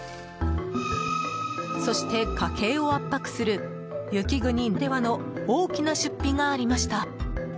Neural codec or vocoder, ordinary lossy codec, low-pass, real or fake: none; none; none; real